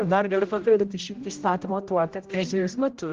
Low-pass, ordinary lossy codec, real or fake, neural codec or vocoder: 7.2 kHz; Opus, 16 kbps; fake; codec, 16 kHz, 0.5 kbps, X-Codec, HuBERT features, trained on general audio